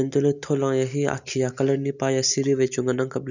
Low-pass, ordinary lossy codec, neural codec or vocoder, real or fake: 7.2 kHz; none; none; real